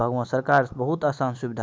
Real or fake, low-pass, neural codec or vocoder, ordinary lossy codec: real; none; none; none